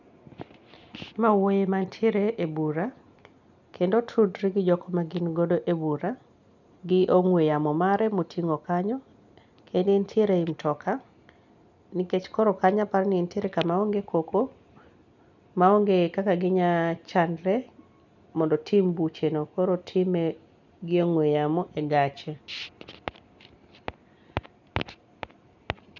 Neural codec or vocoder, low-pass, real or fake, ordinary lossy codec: none; 7.2 kHz; real; none